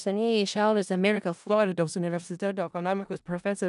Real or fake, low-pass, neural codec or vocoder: fake; 10.8 kHz; codec, 16 kHz in and 24 kHz out, 0.4 kbps, LongCat-Audio-Codec, four codebook decoder